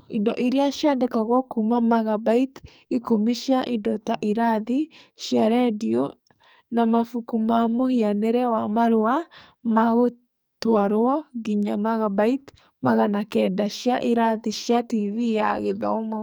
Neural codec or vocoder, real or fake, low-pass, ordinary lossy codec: codec, 44.1 kHz, 2.6 kbps, SNAC; fake; none; none